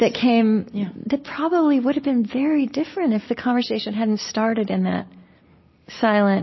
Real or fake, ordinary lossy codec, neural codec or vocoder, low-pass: real; MP3, 24 kbps; none; 7.2 kHz